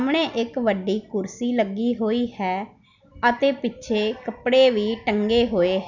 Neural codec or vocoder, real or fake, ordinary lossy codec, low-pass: none; real; none; 7.2 kHz